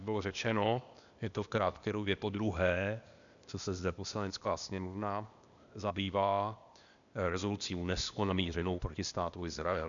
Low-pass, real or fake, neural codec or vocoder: 7.2 kHz; fake; codec, 16 kHz, 0.8 kbps, ZipCodec